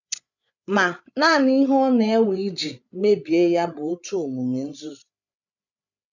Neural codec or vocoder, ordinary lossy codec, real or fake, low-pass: codec, 16 kHz, 8 kbps, FreqCodec, larger model; none; fake; 7.2 kHz